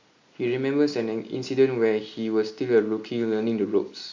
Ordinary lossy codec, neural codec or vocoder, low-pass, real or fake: MP3, 48 kbps; none; 7.2 kHz; real